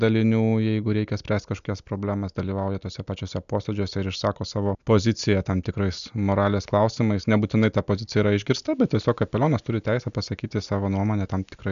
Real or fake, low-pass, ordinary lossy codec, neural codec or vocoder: real; 7.2 kHz; MP3, 96 kbps; none